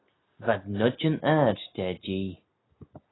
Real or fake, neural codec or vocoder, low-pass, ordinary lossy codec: real; none; 7.2 kHz; AAC, 16 kbps